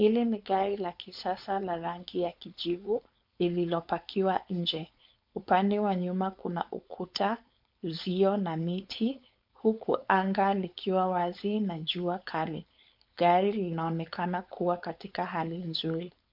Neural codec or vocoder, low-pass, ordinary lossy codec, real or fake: codec, 16 kHz, 4.8 kbps, FACodec; 5.4 kHz; MP3, 48 kbps; fake